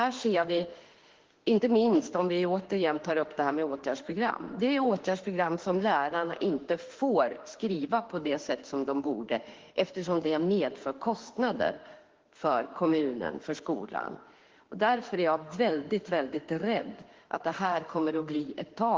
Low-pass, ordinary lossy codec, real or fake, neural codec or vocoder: 7.2 kHz; Opus, 16 kbps; fake; autoencoder, 48 kHz, 32 numbers a frame, DAC-VAE, trained on Japanese speech